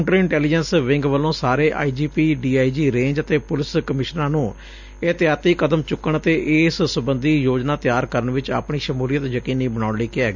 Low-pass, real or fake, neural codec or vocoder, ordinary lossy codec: 7.2 kHz; real; none; none